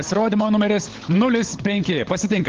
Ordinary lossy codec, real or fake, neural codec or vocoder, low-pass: Opus, 16 kbps; fake; codec, 16 kHz, 16 kbps, FunCodec, trained on LibriTTS, 50 frames a second; 7.2 kHz